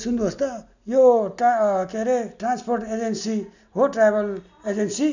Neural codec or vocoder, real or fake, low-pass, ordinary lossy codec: none; real; 7.2 kHz; none